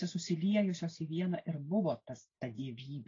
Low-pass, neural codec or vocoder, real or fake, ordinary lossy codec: 7.2 kHz; none; real; AAC, 32 kbps